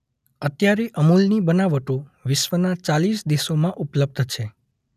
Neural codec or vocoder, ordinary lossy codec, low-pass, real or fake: none; none; 14.4 kHz; real